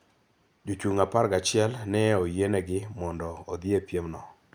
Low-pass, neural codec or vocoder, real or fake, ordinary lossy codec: none; none; real; none